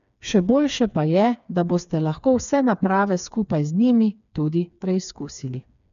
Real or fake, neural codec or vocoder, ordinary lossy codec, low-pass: fake; codec, 16 kHz, 4 kbps, FreqCodec, smaller model; none; 7.2 kHz